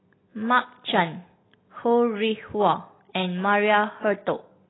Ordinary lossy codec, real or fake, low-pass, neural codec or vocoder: AAC, 16 kbps; real; 7.2 kHz; none